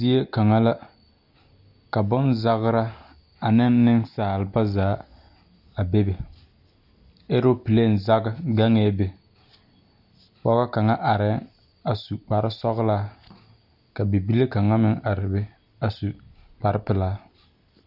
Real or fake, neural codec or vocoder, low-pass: real; none; 5.4 kHz